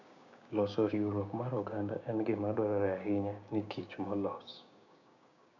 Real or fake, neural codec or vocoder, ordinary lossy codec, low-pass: fake; codec, 16 kHz, 6 kbps, DAC; none; 7.2 kHz